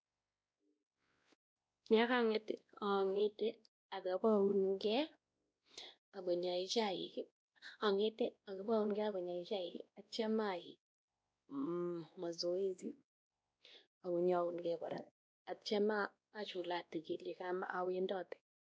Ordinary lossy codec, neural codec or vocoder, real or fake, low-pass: none; codec, 16 kHz, 1 kbps, X-Codec, WavLM features, trained on Multilingual LibriSpeech; fake; none